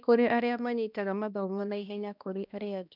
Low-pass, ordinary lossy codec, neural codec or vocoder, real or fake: 5.4 kHz; none; codec, 16 kHz, 1 kbps, X-Codec, HuBERT features, trained on balanced general audio; fake